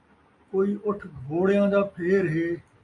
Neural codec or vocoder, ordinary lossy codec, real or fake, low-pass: none; AAC, 48 kbps; real; 10.8 kHz